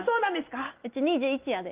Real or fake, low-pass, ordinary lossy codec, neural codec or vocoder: real; 3.6 kHz; Opus, 32 kbps; none